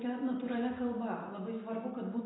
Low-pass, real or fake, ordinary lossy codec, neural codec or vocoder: 7.2 kHz; real; AAC, 16 kbps; none